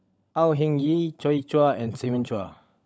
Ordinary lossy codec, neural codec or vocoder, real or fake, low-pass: none; codec, 16 kHz, 16 kbps, FunCodec, trained on LibriTTS, 50 frames a second; fake; none